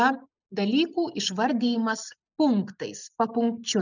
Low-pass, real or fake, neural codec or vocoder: 7.2 kHz; real; none